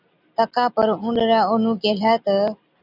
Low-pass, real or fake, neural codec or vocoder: 5.4 kHz; real; none